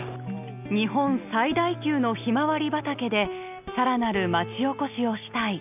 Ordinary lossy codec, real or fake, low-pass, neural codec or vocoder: none; real; 3.6 kHz; none